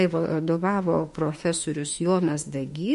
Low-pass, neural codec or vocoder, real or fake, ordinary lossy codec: 14.4 kHz; autoencoder, 48 kHz, 32 numbers a frame, DAC-VAE, trained on Japanese speech; fake; MP3, 48 kbps